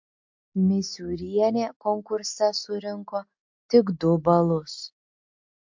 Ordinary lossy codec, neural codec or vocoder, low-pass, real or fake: MP3, 48 kbps; none; 7.2 kHz; real